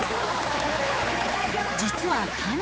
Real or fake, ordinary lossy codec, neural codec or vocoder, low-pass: fake; none; codec, 16 kHz, 4 kbps, X-Codec, HuBERT features, trained on general audio; none